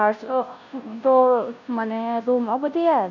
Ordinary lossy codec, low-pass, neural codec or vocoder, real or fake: none; 7.2 kHz; codec, 16 kHz, 0.5 kbps, FunCodec, trained on Chinese and English, 25 frames a second; fake